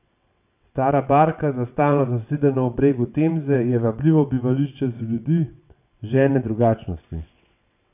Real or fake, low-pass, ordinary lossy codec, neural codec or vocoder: fake; 3.6 kHz; none; vocoder, 22.05 kHz, 80 mel bands, WaveNeXt